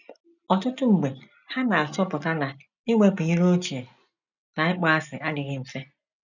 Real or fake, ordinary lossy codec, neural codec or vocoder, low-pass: real; none; none; 7.2 kHz